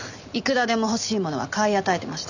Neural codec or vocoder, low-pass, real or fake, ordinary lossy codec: none; 7.2 kHz; real; none